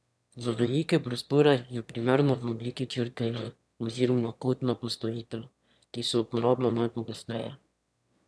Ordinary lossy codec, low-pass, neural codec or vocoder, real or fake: none; none; autoencoder, 22.05 kHz, a latent of 192 numbers a frame, VITS, trained on one speaker; fake